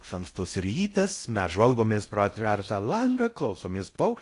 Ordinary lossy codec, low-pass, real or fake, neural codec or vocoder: AAC, 48 kbps; 10.8 kHz; fake; codec, 16 kHz in and 24 kHz out, 0.6 kbps, FocalCodec, streaming, 4096 codes